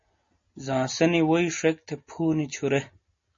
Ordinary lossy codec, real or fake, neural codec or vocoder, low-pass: MP3, 32 kbps; real; none; 7.2 kHz